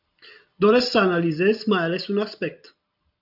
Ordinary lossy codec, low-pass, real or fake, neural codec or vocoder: AAC, 48 kbps; 5.4 kHz; real; none